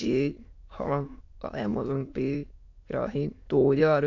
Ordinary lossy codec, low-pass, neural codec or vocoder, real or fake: AAC, 48 kbps; 7.2 kHz; autoencoder, 22.05 kHz, a latent of 192 numbers a frame, VITS, trained on many speakers; fake